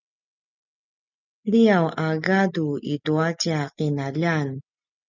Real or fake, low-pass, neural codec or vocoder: real; 7.2 kHz; none